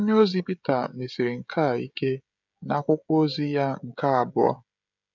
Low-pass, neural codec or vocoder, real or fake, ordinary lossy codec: 7.2 kHz; codec, 16 kHz, 16 kbps, FreqCodec, smaller model; fake; none